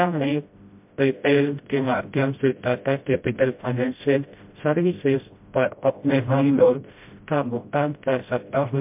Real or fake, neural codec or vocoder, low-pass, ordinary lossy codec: fake; codec, 16 kHz, 0.5 kbps, FreqCodec, smaller model; 3.6 kHz; MP3, 32 kbps